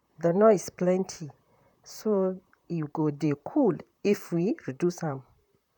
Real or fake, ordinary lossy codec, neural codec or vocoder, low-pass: fake; none; vocoder, 44.1 kHz, 128 mel bands, Pupu-Vocoder; 19.8 kHz